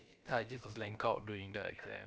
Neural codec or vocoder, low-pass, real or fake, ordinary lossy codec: codec, 16 kHz, about 1 kbps, DyCAST, with the encoder's durations; none; fake; none